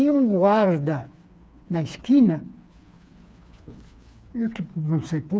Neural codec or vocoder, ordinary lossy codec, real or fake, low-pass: codec, 16 kHz, 4 kbps, FreqCodec, smaller model; none; fake; none